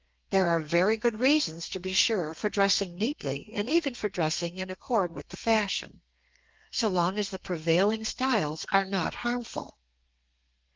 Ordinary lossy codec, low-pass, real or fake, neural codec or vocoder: Opus, 32 kbps; 7.2 kHz; fake; codec, 32 kHz, 1.9 kbps, SNAC